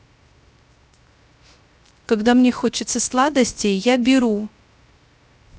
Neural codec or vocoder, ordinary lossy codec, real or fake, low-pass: codec, 16 kHz, 0.3 kbps, FocalCodec; none; fake; none